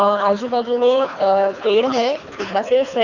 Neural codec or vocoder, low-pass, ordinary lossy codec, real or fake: codec, 24 kHz, 3 kbps, HILCodec; 7.2 kHz; none; fake